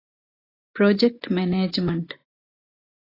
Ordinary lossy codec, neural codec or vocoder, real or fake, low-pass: AAC, 24 kbps; none; real; 5.4 kHz